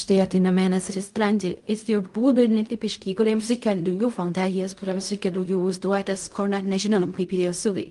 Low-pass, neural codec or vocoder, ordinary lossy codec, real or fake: 10.8 kHz; codec, 16 kHz in and 24 kHz out, 0.4 kbps, LongCat-Audio-Codec, fine tuned four codebook decoder; Opus, 32 kbps; fake